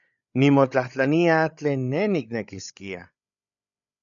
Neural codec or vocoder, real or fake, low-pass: codec, 16 kHz, 8 kbps, FreqCodec, larger model; fake; 7.2 kHz